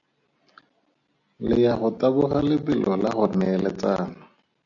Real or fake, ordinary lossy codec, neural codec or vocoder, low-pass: real; AAC, 64 kbps; none; 7.2 kHz